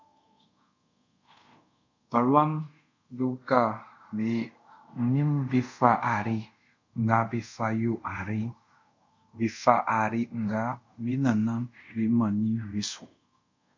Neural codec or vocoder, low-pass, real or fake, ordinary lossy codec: codec, 24 kHz, 0.5 kbps, DualCodec; 7.2 kHz; fake; MP3, 48 kbps